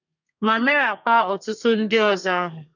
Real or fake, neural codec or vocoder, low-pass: fake; codec, 32 kHz, 1.9 kbps, SNAC; 7.2 kHz